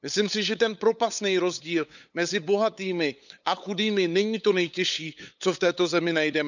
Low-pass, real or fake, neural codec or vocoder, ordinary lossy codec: 7.2 kHz; fake; codec, 16 kHz, 8 kbps, FunCodec, trained on LibriTTS, 25 frames a second; none